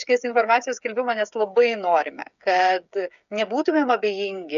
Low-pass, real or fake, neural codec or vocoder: 7.2 kHz; fake; codec, 16 kHz, 8 kbps, FreqCodec, smaller model